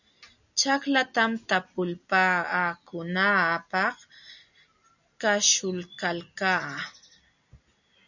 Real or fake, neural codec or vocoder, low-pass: real; none; 7.2 kHz